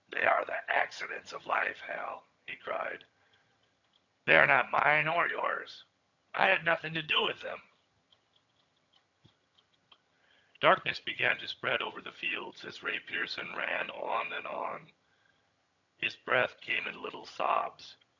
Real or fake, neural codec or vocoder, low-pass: fake; vocoder, 22.05 kHz, 80 mel bands, HiFi-GAN; 7.2 kHz